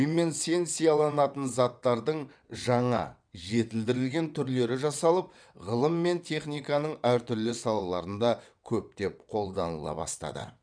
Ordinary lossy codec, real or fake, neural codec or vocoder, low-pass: none; fake; vocoder, 22.05 kHz, 80 mel bands, WaveNeXt; 9.9 kHz